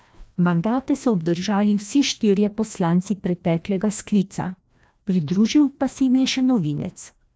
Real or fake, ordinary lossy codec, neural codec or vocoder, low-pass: fake; none; codec, 16 kHz, 1 kbps, FreqCodec, larger model; none